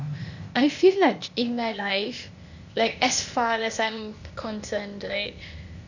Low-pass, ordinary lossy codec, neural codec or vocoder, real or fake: 7.2 kHz; none; codec, 16 kHz, 0.8 kbps, ZipCodec; fake